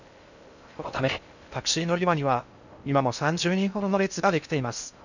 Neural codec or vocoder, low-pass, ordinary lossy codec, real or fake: codec, 16 kHz in and 24 kHz out, 0.6 kbps, FocalCodec, streaming, 2048 codes; 7.2 kHz; none; fake